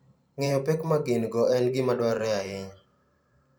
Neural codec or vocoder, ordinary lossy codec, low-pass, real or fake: vocoder, 44.1 kHz, 128 mel bands every 512 samples, BigVGAN v2; none; none; fake